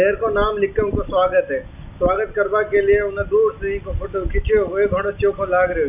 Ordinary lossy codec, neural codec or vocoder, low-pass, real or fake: none; none; 3.6 kHz; real